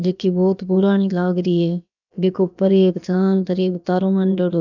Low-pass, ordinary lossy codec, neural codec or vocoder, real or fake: 7.2 kHz; none; codec, 16 kHz, about 1 kbps, DyCAST, with the encoder's durations; fake